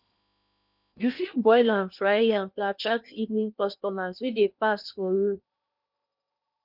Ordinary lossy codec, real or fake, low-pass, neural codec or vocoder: none; fake; 5.4 kHz; codec, 16 kHz in and 24 kHz out, 0.8 kbps, FocalCodec, streaming, 65536 codes